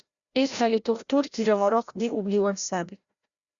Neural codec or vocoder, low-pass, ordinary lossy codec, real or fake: codec, 16 kHz, 0.5 kbps, FreqCodec, larger model; 7.2 kHz; Opus, 64 kbps; fake